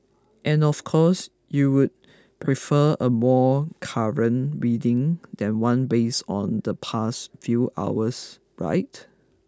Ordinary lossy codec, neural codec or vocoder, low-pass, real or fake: none; none; none; real